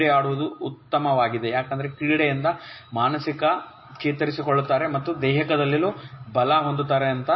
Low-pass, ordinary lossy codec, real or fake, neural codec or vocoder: 7.2 kHz; MP3, 24 kbps; real; none